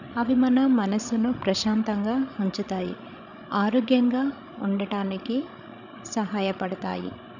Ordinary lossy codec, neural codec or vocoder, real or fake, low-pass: none; codec, 16 kHz, 16 kbps, FreqCodec, larger model; fake; 7.2 kHz